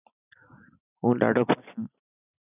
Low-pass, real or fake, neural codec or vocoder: 3.6 kHz; real; none